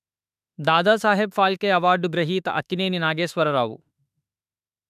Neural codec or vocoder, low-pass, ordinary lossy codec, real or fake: autoencoder, 48 kHz, 32 numbers a frame, DAC-VAE, trained on Japanese speech; 14.4 kHz; none; fake